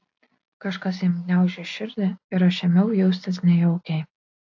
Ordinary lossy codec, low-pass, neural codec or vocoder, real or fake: AAC, 48 kbps; 7.2 kHz; none; real